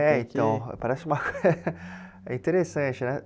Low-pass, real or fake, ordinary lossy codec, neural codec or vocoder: none; real; none; none